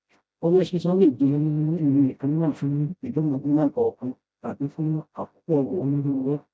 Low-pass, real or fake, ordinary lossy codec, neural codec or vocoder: none; fake; none; codec, 16 kHz, 0.5 kbps, FreqCodec, smaller model